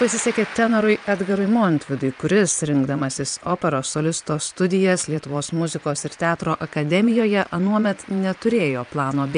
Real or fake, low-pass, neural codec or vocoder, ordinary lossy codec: fake; 9.9 kHz; vocoder, 22.05 kHz, 80 mel bands, Vocos; AAC, 96 kbps